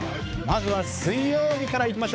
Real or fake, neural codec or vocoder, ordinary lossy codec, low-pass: fake; codec, 16 kHz, 4 kbps, X-Codec, HuBERT features, trained on balanced general audio; none; none